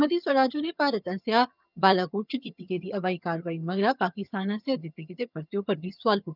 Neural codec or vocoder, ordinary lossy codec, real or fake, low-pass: vocoder, 22.05 kHz, 80 mel bands, HiFi-GAN; none; fake; 5.4 kHz